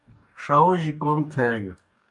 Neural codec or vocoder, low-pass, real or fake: codec, 44.1 kHz, 2.6 kbps, DAC; 10.8 kHz; fake